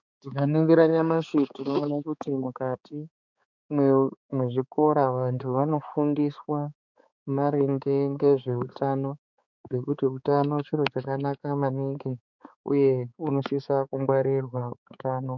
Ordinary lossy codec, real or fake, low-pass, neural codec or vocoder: MP3, 64 kbps; fake; 7.2 kHz; codec, 16 kHz, 4 kbps, X-Codec, HuBERT features, trained on balanced general audio